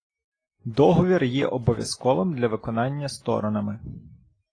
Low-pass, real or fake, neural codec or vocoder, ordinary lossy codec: 9.9 kHz; real; none; AAC, 32 kbps